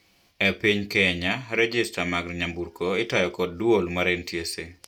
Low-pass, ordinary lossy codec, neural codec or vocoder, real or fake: 19.8 kHz; none; none; real